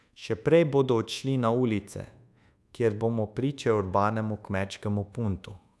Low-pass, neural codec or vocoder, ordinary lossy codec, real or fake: none; codec, 24 kHz, 1.2 kbps, DualCodec; none; fake